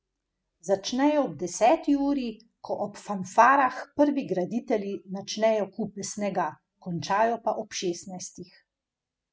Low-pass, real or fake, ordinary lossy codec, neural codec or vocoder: none; real; none; none